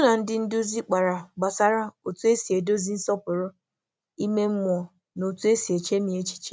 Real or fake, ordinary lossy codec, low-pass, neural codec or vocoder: real; none; none; none